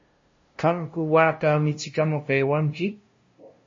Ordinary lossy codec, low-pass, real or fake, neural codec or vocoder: MP3, 32 kbps; 7.2 kHz; fake; codec, 16 kHz, 0.5 kbps, FunCodec, trained on LibriTTS, 25 frames a second